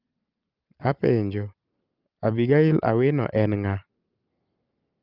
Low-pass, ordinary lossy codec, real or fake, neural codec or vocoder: 5.4 kHz; Opus, 24 kbps; real; none